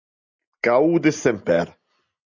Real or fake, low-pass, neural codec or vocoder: real; 7.2 kHz; none